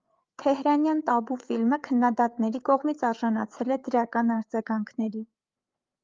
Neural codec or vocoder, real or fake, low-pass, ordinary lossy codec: codec, 16 kHz, 8 kbps, FreqCodec, larger model; fake; 7.2 kHz; Opus, 32 kbps